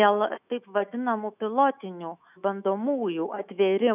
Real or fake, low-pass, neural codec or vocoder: fake; 3.6 kHz; autoencoder, 48 kHz, 128 numbers a frame, DAC-VAE, trained on Japanese speech